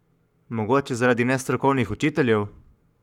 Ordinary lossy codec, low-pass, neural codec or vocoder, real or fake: none; 19.8 kHz; codec, 44.1 kHz, 7.8 kbps, Pupu-Codec; fake